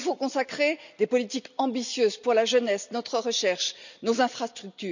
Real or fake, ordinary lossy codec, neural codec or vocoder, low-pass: real; none; none; 7.2 kHz